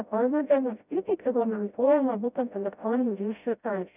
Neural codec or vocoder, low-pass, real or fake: codec, 16 kHz, 0.5 kbps, FreqCodec, smaller model; 3.6 kHz; fake